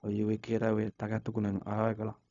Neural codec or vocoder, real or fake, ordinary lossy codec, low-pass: codec, 16 kHz, 0.4 kbps, LongCat-Audio-Codec; fake; none; 7.2 kHz